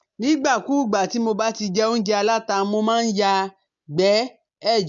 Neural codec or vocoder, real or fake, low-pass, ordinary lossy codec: none; real; 7.2 kHz; MP3, 64 kbps